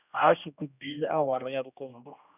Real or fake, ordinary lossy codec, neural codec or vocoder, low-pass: fake; none; codec, 16 kHz, 1 kbps, X-Codec, HuBERT features, trained on general audio; 3.6 kHz